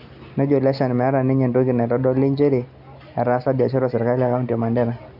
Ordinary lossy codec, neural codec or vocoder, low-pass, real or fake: none; none; 5.4 kHz; real